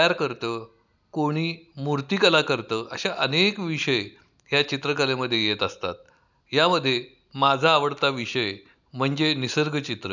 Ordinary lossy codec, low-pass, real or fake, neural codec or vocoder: none; 7.2 kHz; real; none